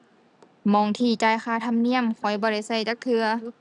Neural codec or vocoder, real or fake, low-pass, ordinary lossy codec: none; real; 10.8 kHz; none